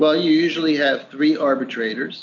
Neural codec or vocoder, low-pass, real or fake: none; 7.2 kHz; real